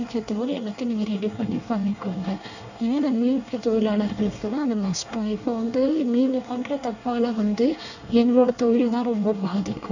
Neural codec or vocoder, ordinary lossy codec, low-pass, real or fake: codec, 24 kHz, 1 kbps, SNAC; none; 7.2 kHz; fake